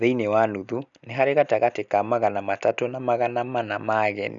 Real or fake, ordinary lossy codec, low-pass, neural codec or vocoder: real; none; 7.2 kHz; none